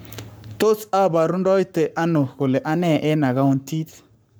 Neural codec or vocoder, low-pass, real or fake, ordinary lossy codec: codec, 44.1 kHz, 7.8 kbps, Pupu-Codec; none; fake; none